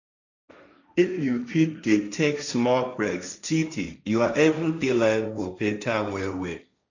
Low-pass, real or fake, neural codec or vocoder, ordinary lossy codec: 7.2 kHz; fake; codec, 16 kHz, 1.1 kbps, Voila-Tokenizer; none